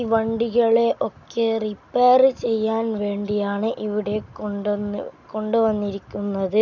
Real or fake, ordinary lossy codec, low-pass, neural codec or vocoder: real; none; 7.2 kHz; none